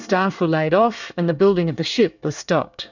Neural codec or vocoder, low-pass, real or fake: codec, 24 kHz, 1 kbps, SNAC; 7.2 kHz; fake